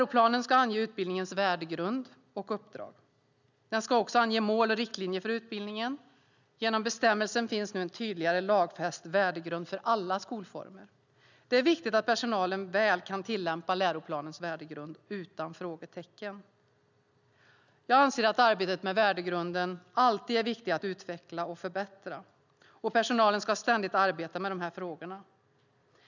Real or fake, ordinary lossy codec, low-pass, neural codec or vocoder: real; none; 7.2 kHz; none